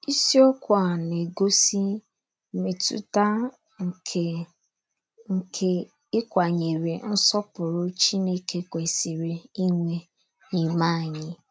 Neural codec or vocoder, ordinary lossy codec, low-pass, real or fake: none; none; none; real